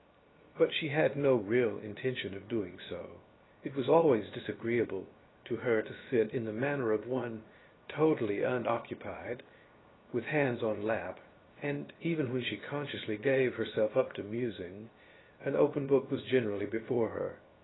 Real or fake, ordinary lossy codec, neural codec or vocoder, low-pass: fake; AAC, 16 kbps; codec, 16 kHz, 0.7 kbps, FocalCodec; 7.2 kHz